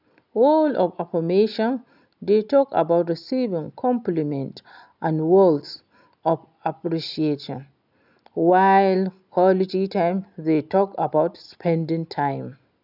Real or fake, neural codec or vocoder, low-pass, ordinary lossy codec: real; none; 5.4 kHz; none